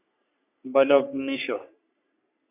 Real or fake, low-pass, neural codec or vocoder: fake; 3.6 kHz; codec, 44.1 kHz, 3.4 kbps, Pupu-Codec